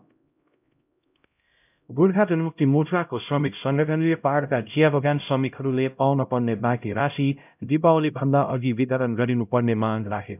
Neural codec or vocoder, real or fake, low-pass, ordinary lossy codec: codec, 16 kHz, 0.5 kbps, X-Codec, HuBERT features, trained on LibriSpeech; fake; 3.6 kHz; none